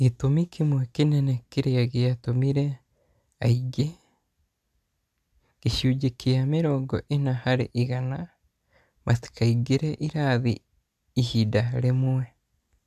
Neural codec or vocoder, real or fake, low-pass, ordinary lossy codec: none; real; 14.4 kHz; none